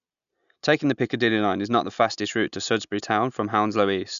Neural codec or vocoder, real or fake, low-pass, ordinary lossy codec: none; real; 7.2 kHz; none